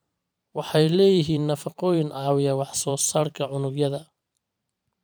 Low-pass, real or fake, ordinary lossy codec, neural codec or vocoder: none; fake; none; vocoder, 44.1 kHz, 128 mel bands every 512 samples, BigVGAN v2